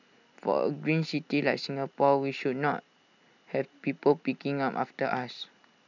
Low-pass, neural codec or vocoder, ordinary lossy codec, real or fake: 7.2 kHz; none; none; real